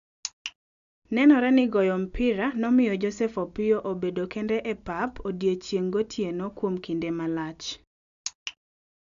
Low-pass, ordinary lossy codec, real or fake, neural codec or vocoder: 7.2 kHz; AAC, 96 kbps; real; none